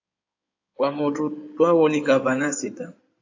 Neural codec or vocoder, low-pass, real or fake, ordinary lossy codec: codec, 16 kHz in and 24 kHz out, 2.2 kbps, FireRedTTS-2 codec; 7.2 kHz; fake; AAC, 48 kbps